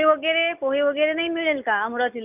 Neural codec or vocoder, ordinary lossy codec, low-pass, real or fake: none; none; 3.6 kHz; real